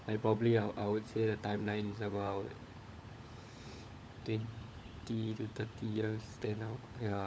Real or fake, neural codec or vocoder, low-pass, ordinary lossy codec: fake; codec, 16 kHz, 16 kbps, FunCodec, trained on LibriTTS, 50 frames a second; none; none